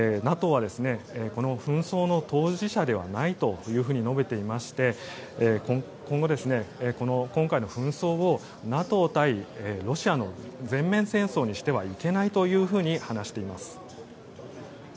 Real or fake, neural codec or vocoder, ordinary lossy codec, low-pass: real; none; none; none